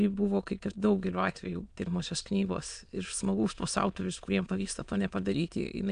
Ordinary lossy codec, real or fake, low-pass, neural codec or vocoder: AAC, 96 kbps; fake; 9.9 kHz; autoencoder, 22.05 kHz, a latent of 192 numbers a frame, VITS, trained on many speakers